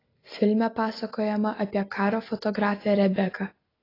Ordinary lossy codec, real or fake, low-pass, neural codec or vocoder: AAC, 24 kbps; real; 5.4 kHz; none